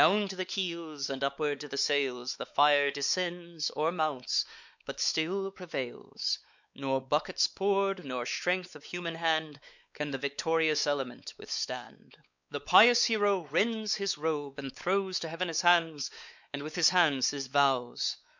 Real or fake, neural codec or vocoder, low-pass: fake; codec, 16 kHz, 4 kbps, X-Codec, WavLM features, trained on Multilingual LibriSpeech; 7.2 kHz